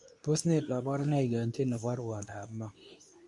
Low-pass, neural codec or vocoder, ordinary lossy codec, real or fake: 10.8 kHz; codec, 24 kHz, 0.9 kbps, WavTokenizer, medium speech release version 2; AAC, 64 kbps; fake